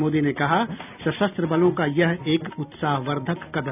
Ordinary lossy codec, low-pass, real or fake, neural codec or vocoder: none; 3.6 kHz; real; none